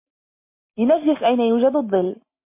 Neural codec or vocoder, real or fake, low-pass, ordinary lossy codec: none; real; 3.6 kHz; MP3, 24 kbps